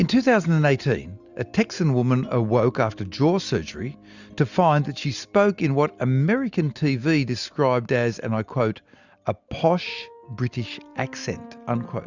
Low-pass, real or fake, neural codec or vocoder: 7.2 kHz; real; none